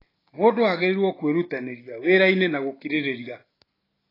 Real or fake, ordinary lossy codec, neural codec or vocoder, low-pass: real; AAC, 24 kbps; none; 5.4 kHz